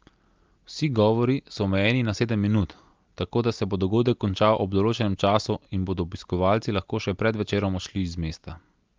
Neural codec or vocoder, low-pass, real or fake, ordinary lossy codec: none; 7.2 kHz; real; Opus, 24 kbps